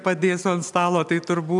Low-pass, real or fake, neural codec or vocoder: 10.8 kHz; real; none